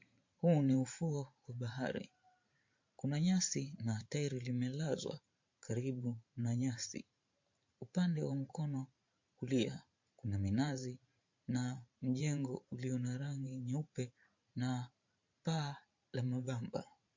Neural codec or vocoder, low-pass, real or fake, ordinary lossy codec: vocoder, 44.1 kHz, 128 mel bands every 512 samples, BigVGAN v2; 7.2 kHz; fake; MP3, 48 kbps